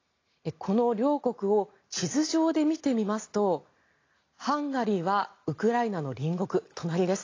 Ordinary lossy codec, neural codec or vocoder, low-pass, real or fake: AAC, 32 kbps; none; 7.2 kHz; real